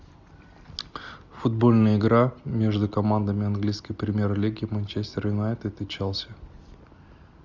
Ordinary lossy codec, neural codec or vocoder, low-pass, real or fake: Opus, 64 kbps; none; 7.2 kHz; real